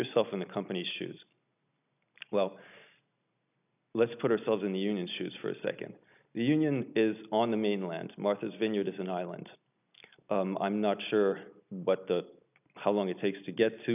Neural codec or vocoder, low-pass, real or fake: none; 3.6 kHz; real